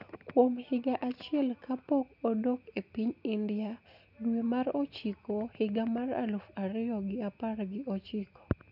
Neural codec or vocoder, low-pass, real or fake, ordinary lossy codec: none; 5.4 kHz; real; none